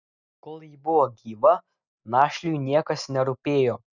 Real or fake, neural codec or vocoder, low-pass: real; none; 7.2 kHz